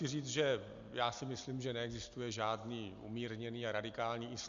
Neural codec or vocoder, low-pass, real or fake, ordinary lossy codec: none; 7.2 kHz; real; Opus, 64 kbps